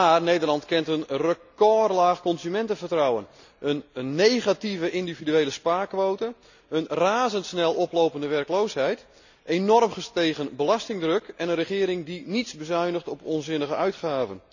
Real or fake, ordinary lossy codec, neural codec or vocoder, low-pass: real; none; none; 7.2 kHz